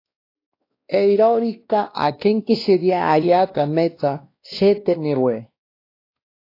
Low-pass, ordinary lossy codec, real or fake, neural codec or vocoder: 5.4 kHz; AAC, 32 kbps; fake; codec, 16 kHz, 1 kbps, X-Codec, WavLM features, trained on Multilingual LibriSpeech